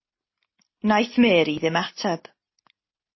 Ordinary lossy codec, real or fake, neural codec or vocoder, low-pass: MP3, 24 kbps; real; none; 7.2 kHz